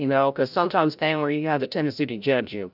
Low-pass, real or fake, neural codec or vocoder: 5.4 kHz; fake; codec, 16 kHz, 0.5 kbps, FreqCodec, larger model